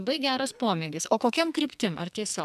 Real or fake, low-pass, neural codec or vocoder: fake; 14.4 kHz; codec, 44.1 kHz, 2.6 kbps, SNAC